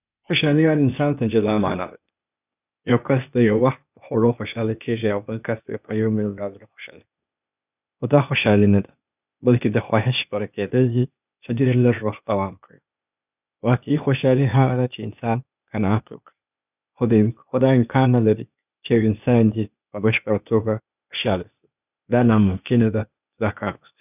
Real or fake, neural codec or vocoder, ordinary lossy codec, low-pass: fake; codec, 16 kHz, 0.8 kbps, ZipCodec; none; 3.6 kHz